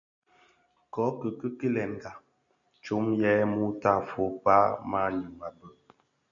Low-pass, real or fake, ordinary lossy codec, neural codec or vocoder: 7.2 kHz; real; MP3, 64 kbps; none